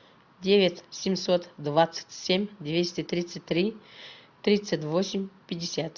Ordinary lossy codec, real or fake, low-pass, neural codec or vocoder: MP3, 64 kbps; real; 7.2 kHz; none